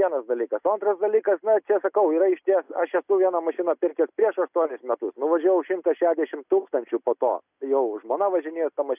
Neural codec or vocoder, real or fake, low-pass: none; real; 3.6 kHz